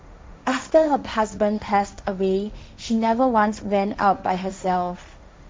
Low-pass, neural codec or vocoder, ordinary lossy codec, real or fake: none; codec, 16 kHz, 1.1 kbps, Voila-Tokenizer; none; fake